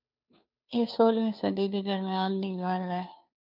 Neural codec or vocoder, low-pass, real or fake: codec, 16 kHz, 2 kbps, FunCodec, trained on Chinese and English, 25 frames a second; 5.4 kHz; fake